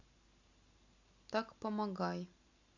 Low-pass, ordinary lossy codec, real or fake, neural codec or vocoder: 7.2 kHz; Opus, 64 kbps; real; none